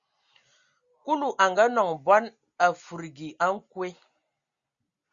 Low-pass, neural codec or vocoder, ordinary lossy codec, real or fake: 7.2 kHz; none; Opus, 64 kbps; real